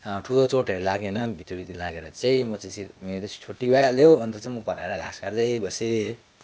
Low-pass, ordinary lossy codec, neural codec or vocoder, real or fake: none; none; codec, 16 kHz, 0.8 kbps, ZipCodec; fake